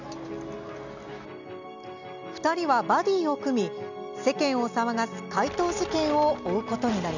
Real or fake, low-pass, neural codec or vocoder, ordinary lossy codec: real; 7.2 kHz; none; none